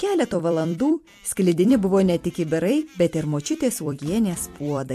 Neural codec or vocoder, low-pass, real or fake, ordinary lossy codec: vocoder, 44.1 kHz, 128 mel bands every 256 samples, BigVGAN v2; 14.4 kHz; fake; MP3, 64 kbps